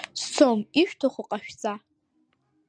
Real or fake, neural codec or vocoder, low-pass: real; none; 9.9 kHz